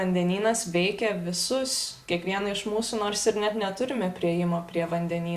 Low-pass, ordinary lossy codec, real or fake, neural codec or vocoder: 14.4 kHz; Opus, 64 kbps; real; none